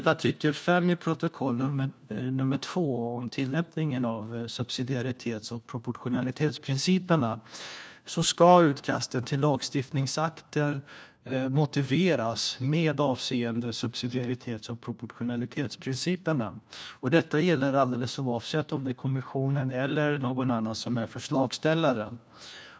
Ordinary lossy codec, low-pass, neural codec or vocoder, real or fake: none; none; codec, 16 kHz, 1 kbps, FunCodec, trained on LibriTTS, 50 frames a second; fake